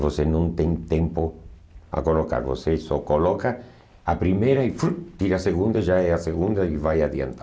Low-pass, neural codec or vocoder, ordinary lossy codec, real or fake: none; none; none; real